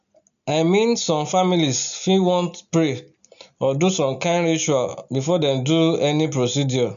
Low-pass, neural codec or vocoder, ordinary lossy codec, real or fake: 7.2 kHz; none; none; real